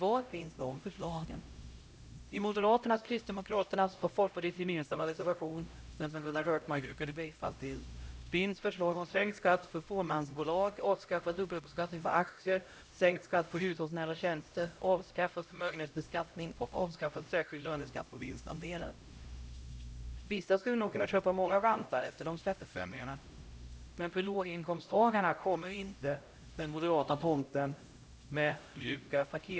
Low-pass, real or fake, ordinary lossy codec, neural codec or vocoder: none; fake; none; codec, 16 kHz, 0.5 kbps, X-Codec, HuBERT features, trained on LibriSpeech